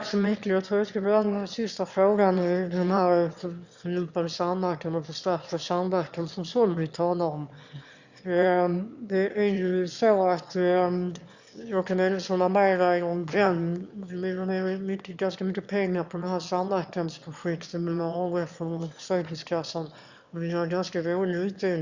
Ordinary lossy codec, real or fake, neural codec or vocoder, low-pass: Opus, 64 kbps; fake; autoencoder, 22.05 kHz, a latent of 192 numbers a frame, VITS, trained on one speaker; 7.2 kHz